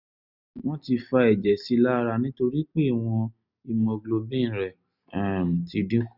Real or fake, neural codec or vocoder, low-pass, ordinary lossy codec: real; none; 5.4 kHz; none